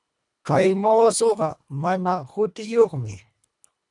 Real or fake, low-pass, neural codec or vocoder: fake; 10.8 kHz; codec, 24 kHz, 1.5 kbps, HILCodec